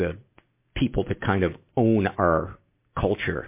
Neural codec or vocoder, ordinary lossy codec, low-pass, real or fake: none; MP3, 24 kbps; 3.6 kHz; real